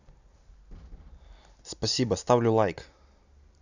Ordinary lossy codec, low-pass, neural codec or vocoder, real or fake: none; 7.2 kHz; none; real